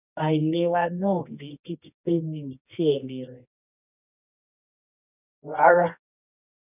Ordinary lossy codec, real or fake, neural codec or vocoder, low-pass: none; fake; codec, 24 kHz, 0.9 kbps, WavTokenizer, medium music audio release; 3.6 kHz